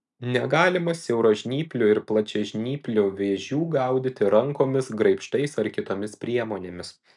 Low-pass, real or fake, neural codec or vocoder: 10.8 kHz; real; none